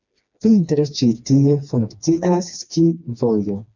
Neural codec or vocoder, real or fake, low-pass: codec, 16 kHz, 2 kbps, FreqCodec, smaller model; fake; 7.2 kHz